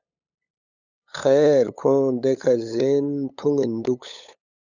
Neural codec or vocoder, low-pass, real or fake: codec, 16 kHz, 8 kbps, FunCodec, trained on LibriTTS, 25 frames a second; 7.2 kHz; fake